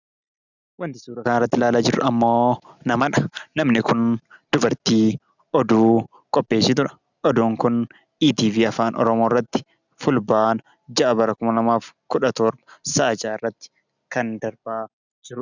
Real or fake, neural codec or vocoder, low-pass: real; none; 7.2 kHz